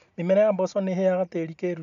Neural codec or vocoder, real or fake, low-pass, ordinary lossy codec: none; real; 7.2 kHz; none